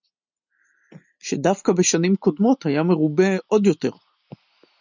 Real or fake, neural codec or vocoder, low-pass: real; none; 7.2 kHz